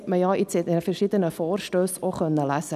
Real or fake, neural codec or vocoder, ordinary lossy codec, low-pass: real; none; none; 14.4 kHz